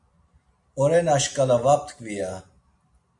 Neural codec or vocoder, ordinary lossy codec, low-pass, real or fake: none; MP3, 64 kbps; 10.8 kHz; real